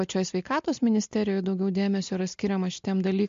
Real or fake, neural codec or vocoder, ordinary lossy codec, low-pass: real; none; MP3, 48 kbps; 7.2 kHz